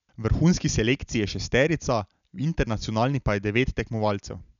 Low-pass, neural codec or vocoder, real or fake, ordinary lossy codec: 7.2 kHz; none; real; none